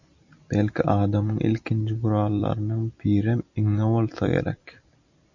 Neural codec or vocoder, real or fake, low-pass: vocoder, 44.1 kHz, 128 mel bands every 256 samples, BigVGAN v2; fake; 7.2 kHz